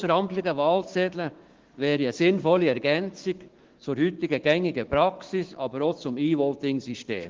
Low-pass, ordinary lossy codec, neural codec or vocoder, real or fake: 7.2 kHz; Opus, 16 kbps; codec, 16 kHz, 6 kbps, DAC; fake